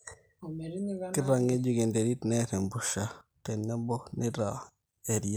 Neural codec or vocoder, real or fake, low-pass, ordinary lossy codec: none; real; none; none